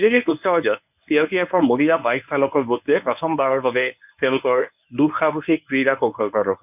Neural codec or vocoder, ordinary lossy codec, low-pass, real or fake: codec, 24 kHz, 0.9 kbps, WavTokenizer, medium speech release version 1; none; 3.6 kHz; fake